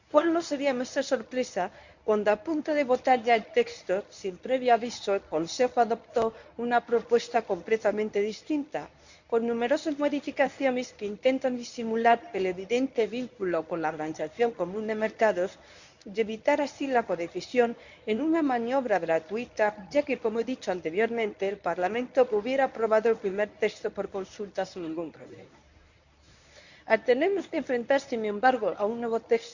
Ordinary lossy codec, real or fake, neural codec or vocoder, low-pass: none; fake; codec, 24 kHz, 0.9 kbps, WavTokenizer, medium speech release version 1; 7.2 kHz